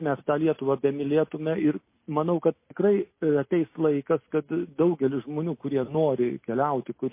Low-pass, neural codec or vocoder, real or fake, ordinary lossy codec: 3.6 kHz; none; real; MP3, 24 kbps